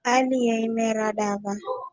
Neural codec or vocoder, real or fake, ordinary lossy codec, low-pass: none; real; Opus, 32 kbps; 7.2 kHz